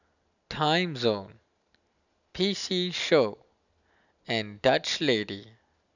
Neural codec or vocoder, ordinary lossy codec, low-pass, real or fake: none; none; 7.2 kHz; real